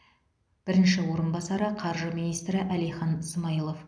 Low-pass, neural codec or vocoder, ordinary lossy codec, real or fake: 9.9 kHz; none; none; real